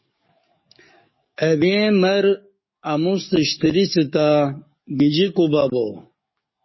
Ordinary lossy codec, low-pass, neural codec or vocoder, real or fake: MP3, 24 kbps; 7.2 kHz; codec, 44.1 kHz, 7.8 kbps, DAC; fake